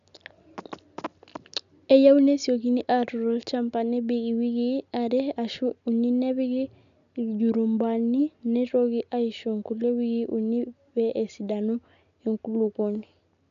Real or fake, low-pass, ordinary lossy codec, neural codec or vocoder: real; 7.2 kHz; none; none